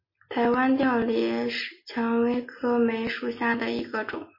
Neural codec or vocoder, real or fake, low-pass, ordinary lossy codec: none; real; 5.4 kHz; MP3, 24 kbps